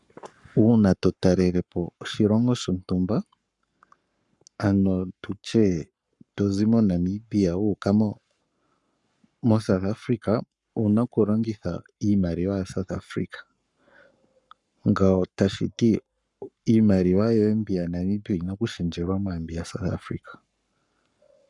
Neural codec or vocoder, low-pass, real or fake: codec, 44.1 kHz, 7.8 kbps, Pupu-Codec; 10.8 kHz; fake